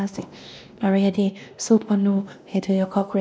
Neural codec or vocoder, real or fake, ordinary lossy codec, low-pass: codec, 16 kHz, 1 kbps, X-Codec, WavLM features, trained on Multilingual LibriSpeech; fake; none; none